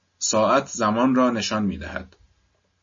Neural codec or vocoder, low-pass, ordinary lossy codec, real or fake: none; 7.2 kHz; MP3, 32 kbps; real